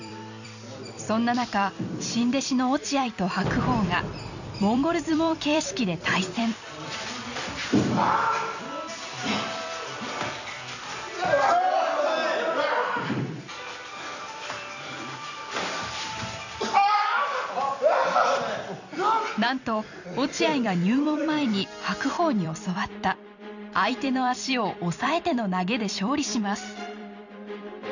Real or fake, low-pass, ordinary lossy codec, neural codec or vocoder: fake; 7.2 kHz; none; vocoder, 44.1 kHz, 128 mel bands every 256 samples, BigVGAN v2